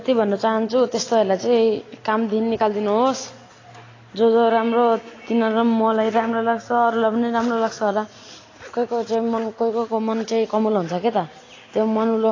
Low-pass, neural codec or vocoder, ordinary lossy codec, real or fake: 7.2 kHz; none; AAC, 32 kbps; real